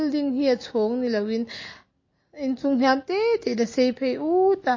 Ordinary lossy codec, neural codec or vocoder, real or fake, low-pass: MP3, 32 kbps; none; real; 7.2 kHz